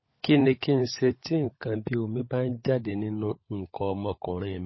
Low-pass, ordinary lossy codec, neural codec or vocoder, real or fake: 7.2 kHz; MP3, 24 kbps; codec, 16 kHz, 16 kbps, FunCodec, trained on LibriTTS, 50 frames a second; fake